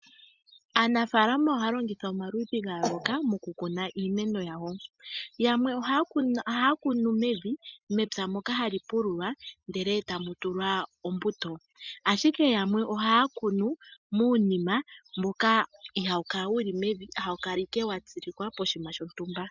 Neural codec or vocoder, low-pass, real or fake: none; 7.2 kHz; real